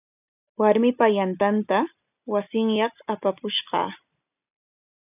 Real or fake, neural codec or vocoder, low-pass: real; none; 3.6 kHz